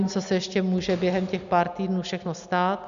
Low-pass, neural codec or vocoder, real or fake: 7.2 kHz; none; real